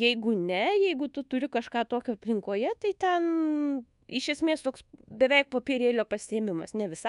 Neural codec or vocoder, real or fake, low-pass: codec, 24 kHz, 1.2 kbps, DualCodec; fake; 10.8 kHz